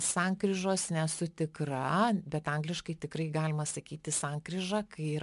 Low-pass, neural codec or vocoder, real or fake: 10.8 kHz; none; real